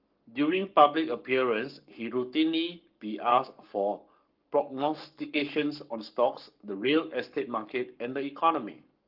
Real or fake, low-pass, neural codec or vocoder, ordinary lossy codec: fake; 5.4 kHz; codec, 44.1 kHz, 7.8 kbps, Pupu-Codec; Opus, 32 kbps